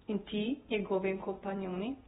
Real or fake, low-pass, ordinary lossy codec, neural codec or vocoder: fake; 19.8 kHz; AAC, 16 kbps; vocoder, 48 kHz, 128 mel bands, Vocos